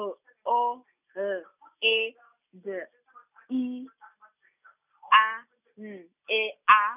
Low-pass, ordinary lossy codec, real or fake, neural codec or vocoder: 3.6 kHz; none; real; none